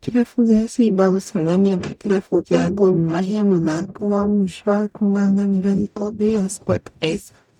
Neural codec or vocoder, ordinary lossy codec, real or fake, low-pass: codec, 44.1 kHz, 0.9 kbps, DAC; none; fake; 19.8 kHz